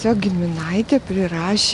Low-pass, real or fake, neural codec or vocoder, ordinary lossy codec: 10.8 kHz; real; none; Opus, 64 kbps